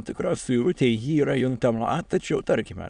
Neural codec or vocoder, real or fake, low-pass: autoencoder, 22.05 kHz, a latent of 192 numbers a frame, VITS, trained on many speakers; fake; 9.9 kHz